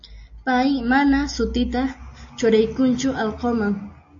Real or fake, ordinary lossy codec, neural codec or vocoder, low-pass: real; AAC, 48 kbps; none; 7.2 kHz